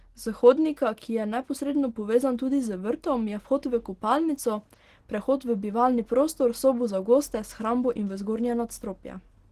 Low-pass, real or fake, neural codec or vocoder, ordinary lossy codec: 14.4 kHz; real; none; Opus, 16 kbps